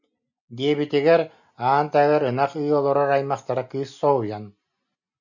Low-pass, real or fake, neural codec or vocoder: 7.2 kHz; real; none